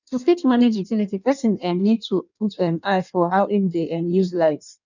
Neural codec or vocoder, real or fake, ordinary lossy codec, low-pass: codec, 16 kHz in and 24 kHz out, 1.1 kbps, FireRedTTS-2 codec; fake; none; 7.2 kHz